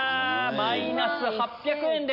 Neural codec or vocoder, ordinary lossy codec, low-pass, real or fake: none; none; 5.4 kHz; real